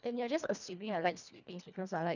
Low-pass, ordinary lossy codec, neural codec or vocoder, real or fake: 7.2 kHz; none; codec, 24 kHz, 1.5 kbps, HILCodec; fake